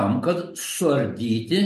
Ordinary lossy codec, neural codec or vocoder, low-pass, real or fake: MP3, 64 kbps; none; 14.4 kHz; real